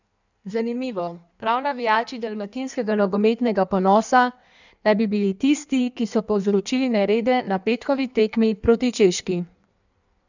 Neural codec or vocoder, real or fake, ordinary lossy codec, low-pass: codec, 16 kHz in and 24 kHz out, 1.1 kbps, FireRedTTS-2 codec; fake; none; 7.2 kHz